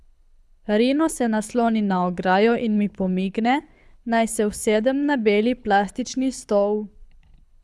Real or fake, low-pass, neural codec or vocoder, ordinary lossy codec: fake; none; codec, 24 kHz, 6 kbps, HILCodec; none